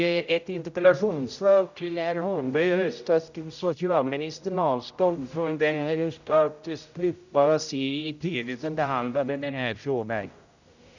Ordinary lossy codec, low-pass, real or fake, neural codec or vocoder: none; 7.2 kHz; fake; codec, 16 kHz, 0.5 kbps, X-Codec, HuBERT features, trained on general audio